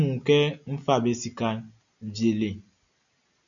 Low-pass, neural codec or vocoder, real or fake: 7.2 kHz; none; real